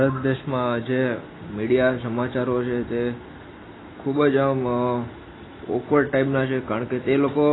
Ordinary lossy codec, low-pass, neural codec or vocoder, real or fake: AAC, 16 kbps; 7.2 kHz; none; real